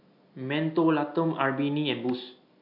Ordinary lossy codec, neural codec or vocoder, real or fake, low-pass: AAC, 48 kbps; none; real; 5.4 kHz